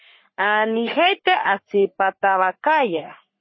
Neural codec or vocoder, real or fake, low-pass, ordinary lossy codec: codec, 44.1 kHz, 3.4 kbps, Pupu-Codec; fake; 7.2 kHz; MP3, 24 kbps